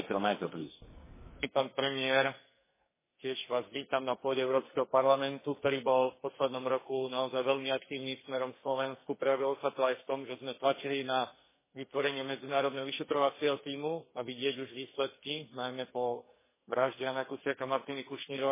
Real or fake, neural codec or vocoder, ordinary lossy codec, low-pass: fake; codec, 44.1 kHz, 2.6 kbps, SNAC; MP3, 16 kbps; 3.6 kHz